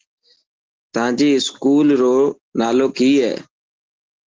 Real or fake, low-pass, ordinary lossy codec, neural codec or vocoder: real; 7.2 kHz; Opus, 16 kbps; none